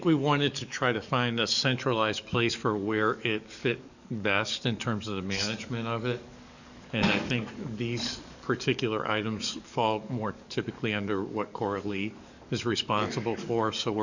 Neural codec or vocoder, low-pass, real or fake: codec, 44.1 kHz, 7.8 kbps, DAC; 7.2 kHz; fake